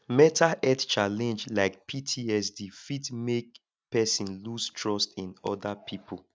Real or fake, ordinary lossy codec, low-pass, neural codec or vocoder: real; none; none; none